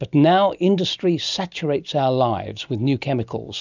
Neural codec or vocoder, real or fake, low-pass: none; real; 7.2 kHz